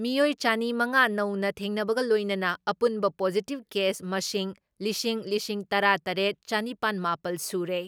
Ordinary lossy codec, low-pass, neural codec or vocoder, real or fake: none; none; none; real